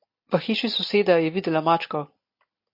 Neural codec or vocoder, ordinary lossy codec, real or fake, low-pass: none; MP3, 32 kbps; real; 5.4 kHz